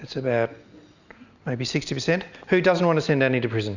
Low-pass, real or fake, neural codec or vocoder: 7.2 kHz; real; none